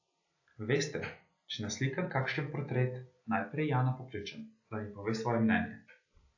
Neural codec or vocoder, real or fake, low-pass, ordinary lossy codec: none; real; 7.2 kHz; AAC, 48 kbps